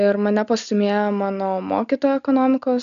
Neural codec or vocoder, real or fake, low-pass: none; real; 7.2 kHz